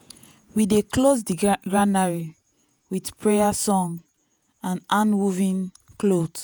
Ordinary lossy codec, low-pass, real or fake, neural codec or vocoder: none; none; fake; vocoder, 48 kHz, 128 mel bands, Vocos